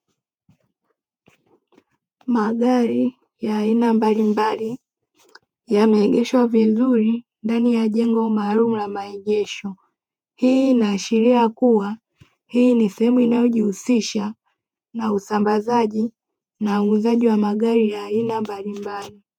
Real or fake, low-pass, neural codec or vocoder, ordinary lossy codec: fake; 19.8 kHz; vocoder, 48 kHz, 128 mel bands, Vocos; MP3, 96 kbps